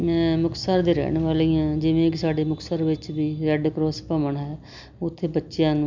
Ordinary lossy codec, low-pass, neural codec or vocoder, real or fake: MP3, 64 kbps; 7.2 kHz; none; real